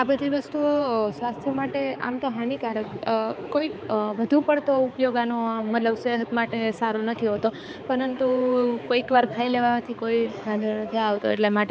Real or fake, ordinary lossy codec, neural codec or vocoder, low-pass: fake; none; codec, 16 kHz, 4 kbps, X-Codec, HuBERT features, trained on balanced general audio; none